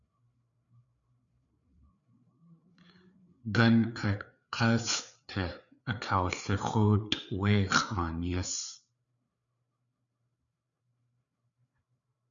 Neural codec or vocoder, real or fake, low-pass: codec, 16 kHz, 4 kbps, FreqCodec, larger model; fake; 7.2 kHz